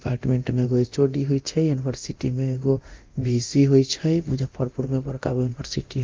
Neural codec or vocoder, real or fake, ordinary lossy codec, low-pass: codec, 24 kHz, 0.9 kbps, DualCodec; fake; Opus, 32 kbps; 7.2 kHz